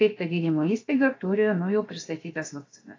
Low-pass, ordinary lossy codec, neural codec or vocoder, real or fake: 7.2 kHz; AAC, 32 kbps; codec, 16 kHz, about 1 kbps, DyCAST, with the encoder's durations; fake